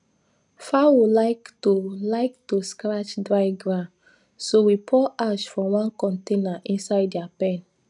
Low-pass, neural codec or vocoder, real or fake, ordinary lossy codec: 10.8 kHz; none; real; none